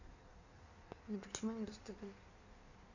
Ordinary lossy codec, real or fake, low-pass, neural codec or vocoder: none; fake; 7.2 kHz; codec, 16 kHz in and 24 kHz out, 1.1 kbps, FireRedTTS-2 codec